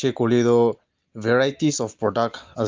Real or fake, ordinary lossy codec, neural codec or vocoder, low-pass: real; Opus, 32 kbps; none; 7.2 kHz